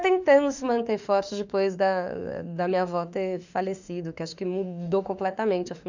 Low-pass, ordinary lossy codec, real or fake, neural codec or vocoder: 7.2 kHz; none; fake; autoencoder, 48 kHz, 32 numbers a frame, DAC-VAE, trained on Japanese speech